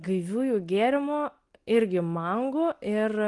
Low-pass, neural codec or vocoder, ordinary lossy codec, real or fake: 10.8 kHz; none; Opus, 24 kbps; real